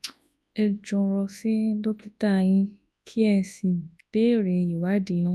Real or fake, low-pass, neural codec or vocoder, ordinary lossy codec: fake; none; codec, 24 kHz, 0.9 kbps, WavTokenizer, large speech release; none